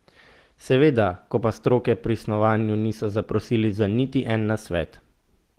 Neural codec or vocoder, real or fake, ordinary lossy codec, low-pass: none; real; Opus, 16 kbps; 14.4 kHz